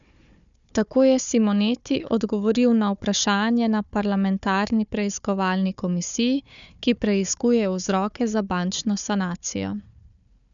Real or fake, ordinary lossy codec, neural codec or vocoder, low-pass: fake; none; codec, 16 kHz, 4 kbps, FunCodec, trained on Chinese and English, 50 frames a second; 7.2 kHz